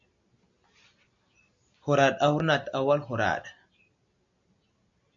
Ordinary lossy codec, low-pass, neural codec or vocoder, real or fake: MP3, 64 kbps; 7.2 kHz; none; real